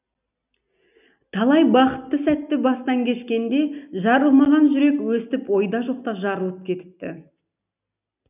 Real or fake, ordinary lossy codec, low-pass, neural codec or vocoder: real; none; 3.6 kHz; none